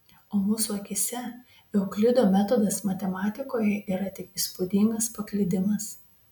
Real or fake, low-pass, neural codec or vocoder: real; 19.8 kHz; none